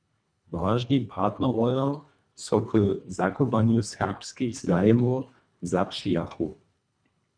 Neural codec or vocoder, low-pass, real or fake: codec, 24 kHz, 1.5 kbps, HILCodec; 9.9 kHz; fake